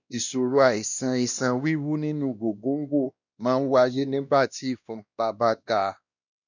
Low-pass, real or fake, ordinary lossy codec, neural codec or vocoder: 7.2 kHz; fake; none; codec, 16 kHz, 1 kbps, X-Codec, WavLM features, trained on Multilingual LibriSpeech